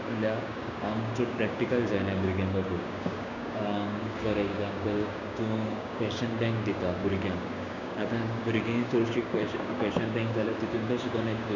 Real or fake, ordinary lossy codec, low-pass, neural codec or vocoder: fake; none; 7.2 kHz; codec, 16 kHz, 6 kbps, DAC